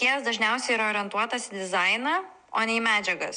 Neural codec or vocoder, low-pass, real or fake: none; 9.9 kHz; real